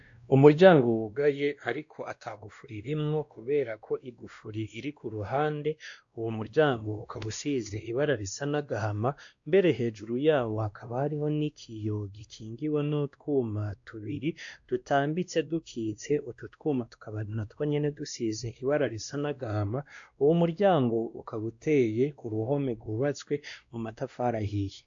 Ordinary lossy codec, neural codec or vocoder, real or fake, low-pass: AAC, 64 kbps; codec, 16 kHz, 1 kbps, X-Codec, WavLM features, trained on Multilingual LibriSpeech; fake; 7.2 kHz